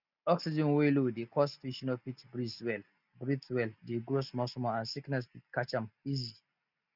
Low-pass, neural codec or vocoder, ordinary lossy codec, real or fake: 5.4 kHz; none; none; real